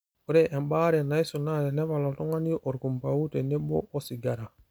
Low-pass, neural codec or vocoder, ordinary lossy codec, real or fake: none; none; none; real